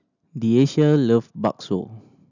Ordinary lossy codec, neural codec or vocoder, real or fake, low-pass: none; none; real; 7.2 kHz